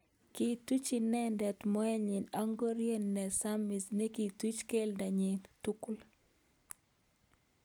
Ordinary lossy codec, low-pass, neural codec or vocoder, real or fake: none; none; none; real